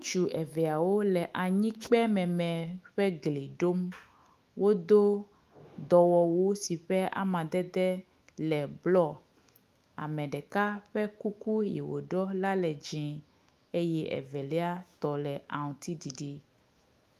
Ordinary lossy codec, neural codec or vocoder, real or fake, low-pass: Opus, 32 kbps; autoencoder, 48 kHz, 128 numbers a frame, DAC-VAE, trained on Japanese speech; fake; 14.4 kHz